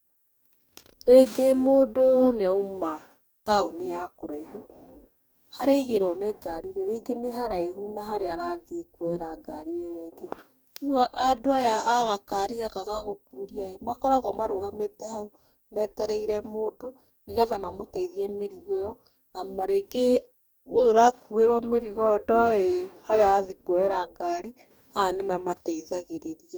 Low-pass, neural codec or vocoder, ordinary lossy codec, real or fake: none; codec, 44.1 kHz, 2.6 kbps, DAC; none; fake